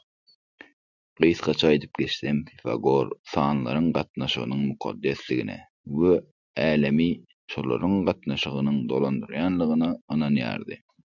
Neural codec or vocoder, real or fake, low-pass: none; real; 7.2 kHz